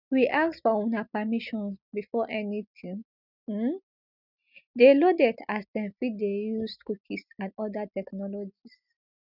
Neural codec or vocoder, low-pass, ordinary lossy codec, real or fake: none; 5.4 kHz; none; real